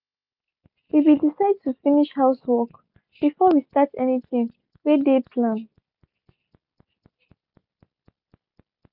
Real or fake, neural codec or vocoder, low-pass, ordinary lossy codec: real; none; 5.4 kHz; none